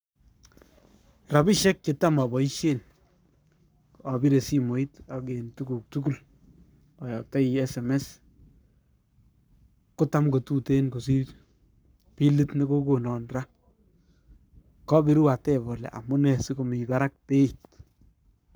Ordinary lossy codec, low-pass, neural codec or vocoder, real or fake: none; none; codec, 44.1 kHz, 7.8 kbps, Pupu-Codec; fake